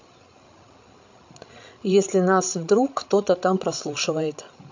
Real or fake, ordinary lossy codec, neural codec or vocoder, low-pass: fake; MP3, 48 kbps; codec, 16 kHz, 16 kbps, FreqCodec, larger model; 7.2 kHz